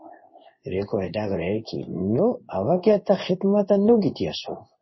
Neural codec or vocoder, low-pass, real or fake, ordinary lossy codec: codec, 16 kHz in and 24 kHz out, 1 kbps, XY-Tokenizer; 7.2 kHz; fake; MP3, 24 kbps